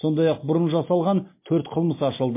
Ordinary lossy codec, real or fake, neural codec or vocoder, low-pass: MP3, 24 kbps; real; none; 3.6 kHz